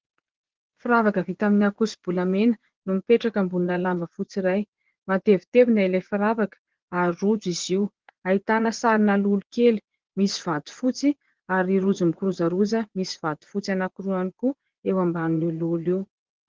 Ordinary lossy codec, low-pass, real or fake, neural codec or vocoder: Opus, 16 kbps; 7.2 kHz; fake; vocoder, 24 kHz, 100 mel bands, Vocos